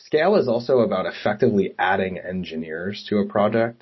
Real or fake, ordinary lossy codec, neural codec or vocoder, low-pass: real; MP3, 24 kbps; none; 7.2 kHz